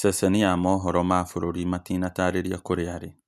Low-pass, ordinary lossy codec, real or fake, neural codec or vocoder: 14.4 kHz; none; real; none